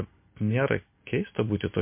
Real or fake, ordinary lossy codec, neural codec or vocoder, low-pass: real; MP3, 24 kbps; none; 3.6 kHz